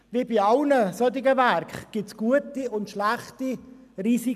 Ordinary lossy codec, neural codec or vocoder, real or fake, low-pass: none; none; real; 14.4 kHz